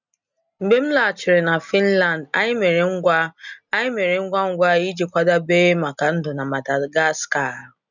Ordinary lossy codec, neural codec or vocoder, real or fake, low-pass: none; none; real; 7.2 kHz